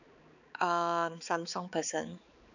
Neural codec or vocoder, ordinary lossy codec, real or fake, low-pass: codec, 16 kHz, 4 kbps, X-Codec, HuBERT features, trained on balanced general audio; none; fake; 7.2 kHz